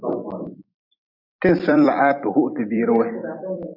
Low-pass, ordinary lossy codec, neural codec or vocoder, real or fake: 5.4 kHz; AAC, 48 kbps; vocoder, 44.1 kHz, 128 mel bands every 256 samples, BigVGAN v2; fake